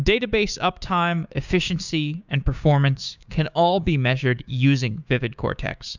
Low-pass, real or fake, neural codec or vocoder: 7.2 kHz; fake; codec, 16 kHz, 6 kbps, DAC